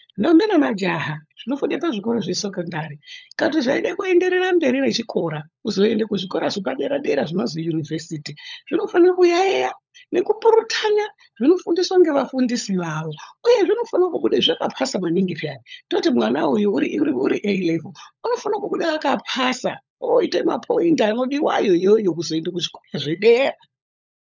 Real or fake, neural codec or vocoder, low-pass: fake; codec, 16 kHz, 16 kbps, FunCodec, trained on LibriTTS, 50 frames a second; 7.2 kHz